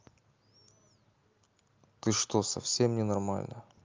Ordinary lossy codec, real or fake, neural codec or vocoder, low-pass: Opus, 24 kbps; real; none; 7.2 kHz